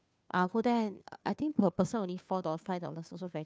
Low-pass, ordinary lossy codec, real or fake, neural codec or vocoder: none; none; fake; codec, 16 kHz, 2 kbps, FunCodec, trained on Chinese and English, 25 frames a second